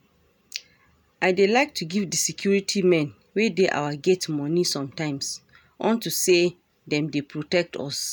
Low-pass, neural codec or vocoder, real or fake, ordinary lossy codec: none; none; real; none